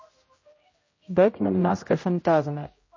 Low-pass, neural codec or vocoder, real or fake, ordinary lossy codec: 7.2 kHz; codec, 16 kHz, 0.5 kbps, X-Codec, HuBERT features, trained on general audio; fake; MP3, 32 kbps